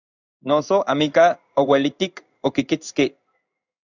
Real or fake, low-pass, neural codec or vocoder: fake; 7.2 kHz; codec, 16 kHz in and 24 kHz out, 1 kbps, XY-Tokenizer